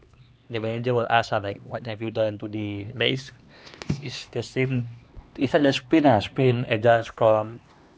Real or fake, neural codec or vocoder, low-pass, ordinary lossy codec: fake; codec, 16 kHz, 2 kbps, X-Codec, HuBERT features, trained on LibriSpeech; none; none